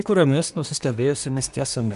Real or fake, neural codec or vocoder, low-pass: fake; codec, 24 kHz, 1 kbps, SNAC; 10.8 kHz